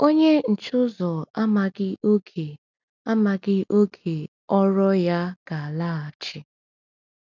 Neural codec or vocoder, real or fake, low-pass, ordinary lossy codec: none; real; 7.2 kHz; none